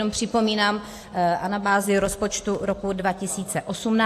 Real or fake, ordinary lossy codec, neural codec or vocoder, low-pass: real; AAC, 64 kbps; none; 14.4 kHz